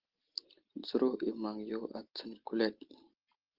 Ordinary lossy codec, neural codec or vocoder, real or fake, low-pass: Opus, 16 kbps; none; real; 5.4 kHz